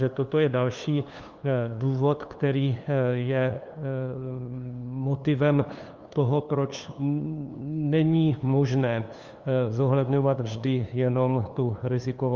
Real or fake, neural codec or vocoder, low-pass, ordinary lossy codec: fake; codec, 16 kHz, 2 kbps, FunCodec, trained on LibriTTS, 25 frames a second; 7.2 kHz; Opus, 32 kbps